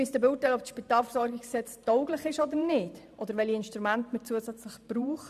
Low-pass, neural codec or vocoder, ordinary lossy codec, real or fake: 14.4 kHz; vocoder, 44.1 kHz, 128 mel bands every 512 samples, BigVGAN v2; AAC, 96 kbps; fake